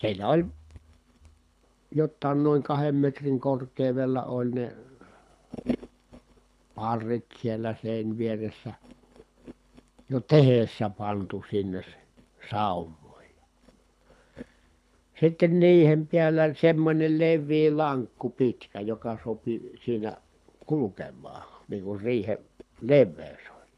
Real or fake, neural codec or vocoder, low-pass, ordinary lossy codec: fake; codec, 24 kHz, 6 kbps, HILCodec; none; none